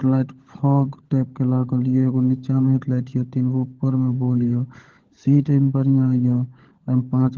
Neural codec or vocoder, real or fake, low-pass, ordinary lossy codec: codec, 16 kHz, 16 kbps, FunCodec, trained on LibriTTS, 50 frames a second; fake; 7.2 kHz; Opus, 16 kbps